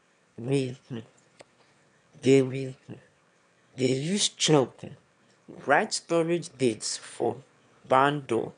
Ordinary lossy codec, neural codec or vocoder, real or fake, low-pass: none; autoencoder, 22.05 kHz, a latent of 192 numbers a frame, VITS, trained on one speaker; fake; 9.9 kHz